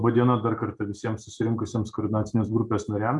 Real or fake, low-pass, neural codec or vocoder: real; 10.8 kHz; none